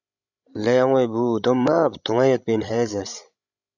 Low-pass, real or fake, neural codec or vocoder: 7.2 kHz; fake; codec, 16 kHz, 16 kbps, FreqCodec, larger model